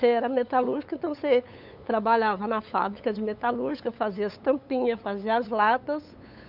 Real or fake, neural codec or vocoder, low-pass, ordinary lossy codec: fake; codec, 16 kHz, 8 kbps, FunCodec, trained on LibriTTS, 25 frames a second; 5.4 kHz; none